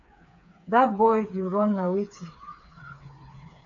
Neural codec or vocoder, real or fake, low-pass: codec, 16 kHz, 4 kbps, FreqCodec, smaller model; fake; 7.2 kHz